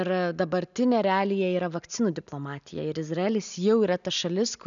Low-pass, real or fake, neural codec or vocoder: 7.2 kHz; real; none